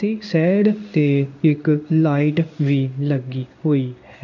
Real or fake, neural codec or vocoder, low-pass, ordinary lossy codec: fake; codec, 16 kHz in and 24 kHz out, 1 kbps, XY-Tokenizer; 7.2 kHz; none